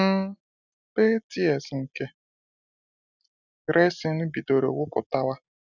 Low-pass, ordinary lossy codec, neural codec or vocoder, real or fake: 7.2 kHz; none; none; real